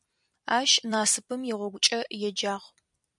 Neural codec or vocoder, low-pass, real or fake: vocoder, 44.1 kHz, 128 mel bands every 256 samples, BigVGAN v2; 10.8 kHz; fake